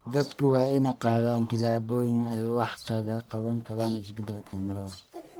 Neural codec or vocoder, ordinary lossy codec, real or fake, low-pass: codec, 44.1 kHz, 1.7 kbps, Pupu-Codec; none; fake; none